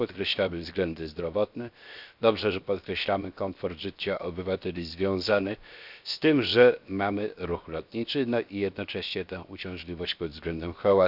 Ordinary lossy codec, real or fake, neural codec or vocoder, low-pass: none; fake; codec, 16 kHz, 0.7 kbps, FocalCodec; 5.4 kHz